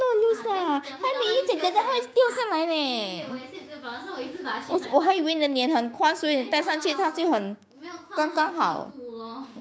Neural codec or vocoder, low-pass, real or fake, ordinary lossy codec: codec, 16 kHz, 6 kbps, DAC; none; fake; none